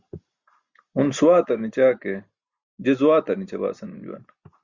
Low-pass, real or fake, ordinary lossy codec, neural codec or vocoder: 7.2 kHz; real; Opus, 64 kbps; none